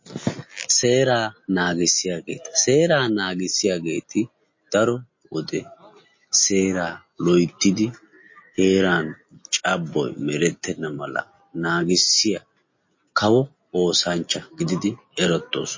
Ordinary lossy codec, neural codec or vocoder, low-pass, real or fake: MP3, 32 kbps; none; 7.2 kHz; real